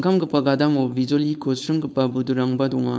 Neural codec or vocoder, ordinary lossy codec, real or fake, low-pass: codec, 16 kHz, 4.8 kbps, FACodec; none; fake; none